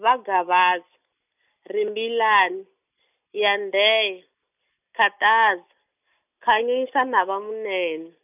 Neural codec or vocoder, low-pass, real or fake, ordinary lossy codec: none; 3.6 kHz; real; none